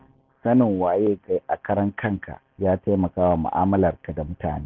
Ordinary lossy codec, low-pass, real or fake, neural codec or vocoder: Opus, 32 kbps; 7.2 kHz; real; none